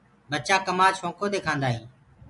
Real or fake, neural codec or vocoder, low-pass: real; none; 10.8 kHz